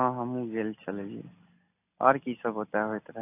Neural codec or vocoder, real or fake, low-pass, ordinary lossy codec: none; real; 3.6 kHz; AAC, 24 kbps